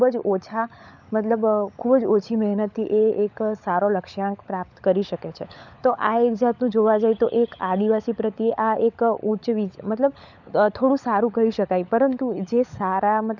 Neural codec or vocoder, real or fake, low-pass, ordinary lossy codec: codec, 16 kHz, 16 kbps, FunCodec, trained on LibriTTS, 50 frames a second; fake; 7.2 kHz; none